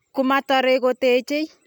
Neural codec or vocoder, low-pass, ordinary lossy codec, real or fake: none; 19.8 kHz; none; real